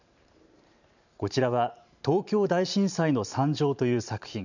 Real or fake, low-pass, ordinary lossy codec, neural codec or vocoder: fake; 7.2 kHz; none; vocoder, 44.1 kHz, 128 mel bands every 512 samples, BigVGAN v2